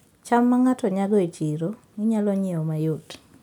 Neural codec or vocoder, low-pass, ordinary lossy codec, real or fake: none; 19.8 kHz; none; real